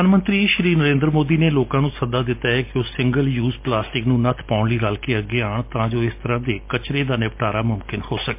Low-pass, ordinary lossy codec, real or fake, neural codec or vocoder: 3.6 kHz; MP3, 32 kbps; real; none